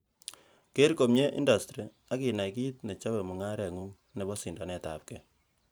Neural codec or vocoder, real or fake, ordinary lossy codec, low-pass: vocoder, 44.1 kHz, 128 mel bands every 512 samples, BigVGAN v2; fake; none; none